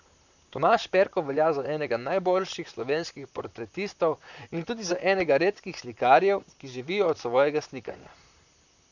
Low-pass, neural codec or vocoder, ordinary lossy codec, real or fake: 7.2 kHz; vocoder, 44.1 kHz, 128 mel bands, Pupu-Vocoder; none; fake